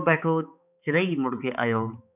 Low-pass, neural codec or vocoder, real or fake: 3.6 kHz; codec, 16 kHz, 4 kbps, X-Codec, HuBERT features, trained on balanced general audio; fake